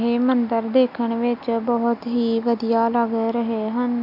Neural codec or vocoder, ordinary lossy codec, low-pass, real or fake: none; none; 5.4 kHz; real